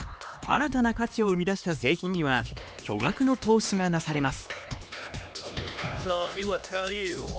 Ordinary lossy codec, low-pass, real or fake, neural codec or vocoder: none; none; fake; codec, 16 kHz, 2 kbps, X-Codec, HuBERT features, trained on LibriSpeech